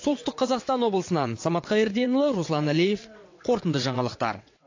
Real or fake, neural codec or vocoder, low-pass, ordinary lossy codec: fake; vocoder, 22.05 kHz, 80 mel bands, WaveNeXt; 7.2 kHz; AAC, 48 kbps